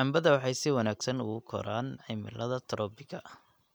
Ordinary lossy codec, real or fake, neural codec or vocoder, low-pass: none; real; none; none